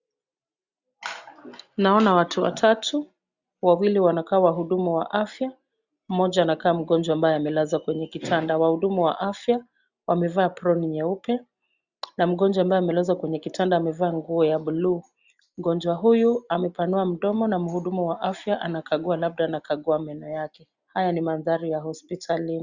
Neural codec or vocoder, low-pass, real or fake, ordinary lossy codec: none; 7.2 kHz; real; Opus, 64 kbps